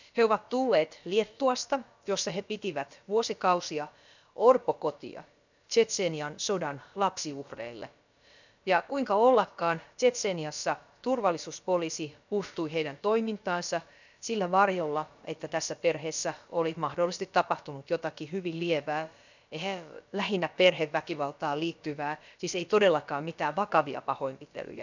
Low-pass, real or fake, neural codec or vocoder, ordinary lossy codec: 7.2 kHz; fake; codec, 16 kHz, about 1 kbps, DyCAST, with the encoder's durations; none